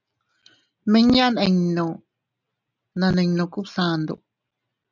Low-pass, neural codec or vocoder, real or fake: 7.2 kHz; none; real